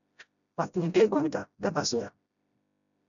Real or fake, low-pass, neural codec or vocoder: fake; 7.2 kHz; codec, 16 kHz, 0.5 kbps, FreqCodec, smaller model